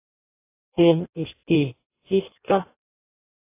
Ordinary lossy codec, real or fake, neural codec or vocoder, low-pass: AAC, 24 kbps; fake; codec, 16 kHz in and 24 kHz out, 0.6 kbps, FireRedTTS-2 codec; 3.6 kHz